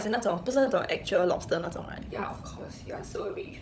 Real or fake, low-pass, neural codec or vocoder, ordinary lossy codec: fake; none; codec, 16 kHz, 16 kbps, FunCodec, trained on LibriTTS, 50 frames a second; none